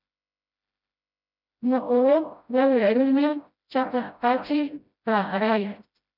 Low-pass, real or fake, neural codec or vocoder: 5.4 kHz; fake; codec, 16 kHz, 0.5 kbps, FreqCodec, smaller model